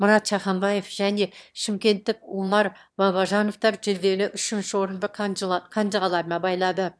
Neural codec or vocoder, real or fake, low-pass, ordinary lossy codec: autoencoder, 22.05 kHz, a latent of 192 numbers a frame, VITS, trained on one speaker; fake; none; none